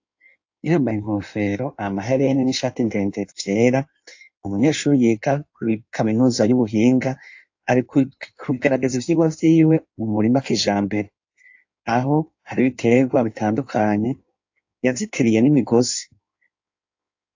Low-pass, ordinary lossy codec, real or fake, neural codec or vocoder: 7.2 kHz; AAC, 48 kbps; fake; codec, 16 kHz in and 24 kHz out, 1.1 kbps, FireRedTTS-2 codec